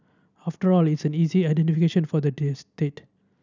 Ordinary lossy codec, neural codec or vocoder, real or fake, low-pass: none; none; real; 7.2 kHz